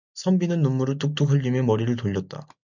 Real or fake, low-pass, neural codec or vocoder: real; 7.2 kHz; none